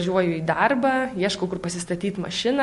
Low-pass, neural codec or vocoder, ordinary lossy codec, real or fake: 14.4 kHz; none; MP3, 48 kbps; real